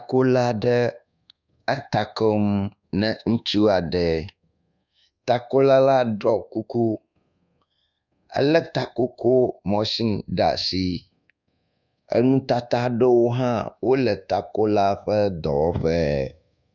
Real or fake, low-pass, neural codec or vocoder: fake; 7.2 kHz; codec, 24 kHz, 1.2 kbps, DualCodec